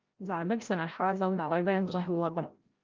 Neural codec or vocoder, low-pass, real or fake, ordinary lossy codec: codec, 16 kHz, 0.5 kbps, FreqCodec, larger model; 7.2 kHz; fake; Opus, 24 kbps